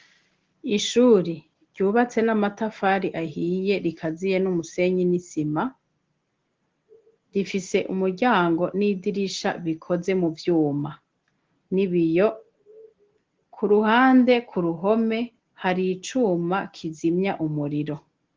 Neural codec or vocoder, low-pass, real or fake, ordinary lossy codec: none; 7.2 kHz; real; Opus, 16 kbps